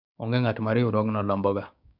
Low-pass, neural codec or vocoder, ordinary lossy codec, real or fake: 5.4 kHz; codec, 16 kHz, 6 kbps, DAC; none; fake